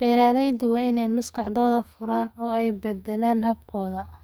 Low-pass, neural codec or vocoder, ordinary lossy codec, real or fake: none; codec, 44.1 kHz, 2.6 kbps, SNAC; none; fake